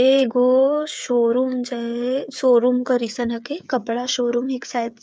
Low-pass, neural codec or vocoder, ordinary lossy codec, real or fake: none; codec, 16 kHz, 8 kbps, FreqCodec, smaller model; none; fake